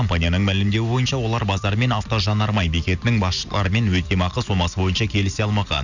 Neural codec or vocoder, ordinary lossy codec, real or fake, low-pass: none; none; real; 7.2 kHz